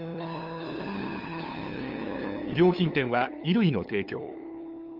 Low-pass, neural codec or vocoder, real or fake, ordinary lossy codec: 5.4 kHz; codec, 16 kHz, 8 kbps, FunCodec, trained on LibriTTS, 25 frames a second; fake; Opus, 24 kbps